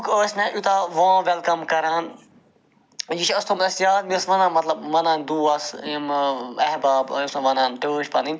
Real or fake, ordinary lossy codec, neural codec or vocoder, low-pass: real; none; none; none